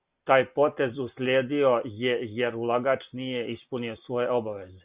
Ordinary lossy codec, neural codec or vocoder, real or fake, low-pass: Opus, 64 kbps; none; real; 3.6 kHz